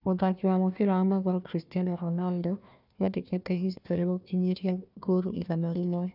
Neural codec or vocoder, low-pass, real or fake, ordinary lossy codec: codec, 16 kHz, 1 kbps, FunCodec, trained on Chinese and English, 50 frames a second; 5.4 kHz; fake; none